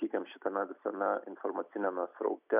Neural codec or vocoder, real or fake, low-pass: none; real; 3.6 kHz